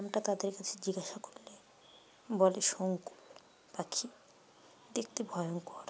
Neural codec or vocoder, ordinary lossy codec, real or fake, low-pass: none; none; real; none